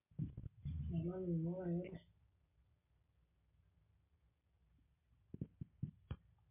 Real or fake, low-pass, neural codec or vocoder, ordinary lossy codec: real; 3.6 kHz; none; MP3, 24 kbps